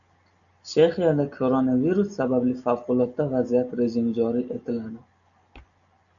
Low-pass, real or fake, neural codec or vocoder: 7.2 kHz; real; none